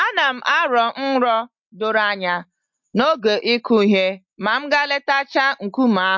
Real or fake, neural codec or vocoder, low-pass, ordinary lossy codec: real; none; 7.2 kHz; MP3, 48 kbps